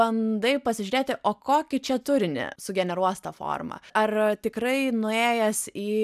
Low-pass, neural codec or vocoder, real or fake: 14.4 kHz; none; real